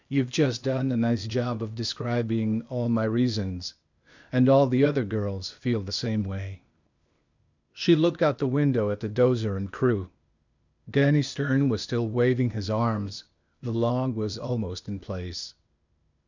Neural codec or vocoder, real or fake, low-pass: codec, 16 kHz, 0.8 kbps, ZipCodec; fake; 7.2 kHz